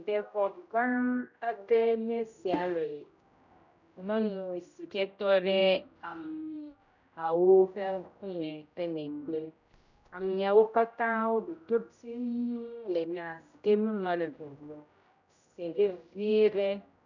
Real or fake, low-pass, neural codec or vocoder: fake; 7.2 kHz; codec, 16 kHz, 0.5 kbps, X-Codec, HuBERT features, trained on general audio